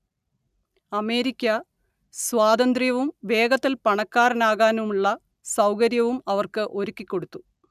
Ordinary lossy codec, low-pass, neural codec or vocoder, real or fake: none; 14.4 kHz; none; real